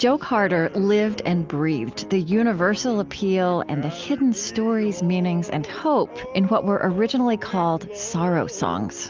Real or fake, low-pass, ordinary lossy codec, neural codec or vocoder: real; 7.2 kHz; Opus, 32 kbps; none